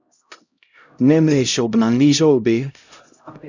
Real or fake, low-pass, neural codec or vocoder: fake; 7.2 kHz; codec, 16 kHz, 0.5 kbps, X-Codec, HuBERT features, trained on LibriSpeech